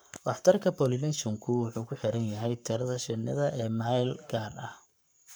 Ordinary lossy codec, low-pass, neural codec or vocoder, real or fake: none; none; codec, 44.1 kHz, 7.8 kbps, Pupu-Codec; fake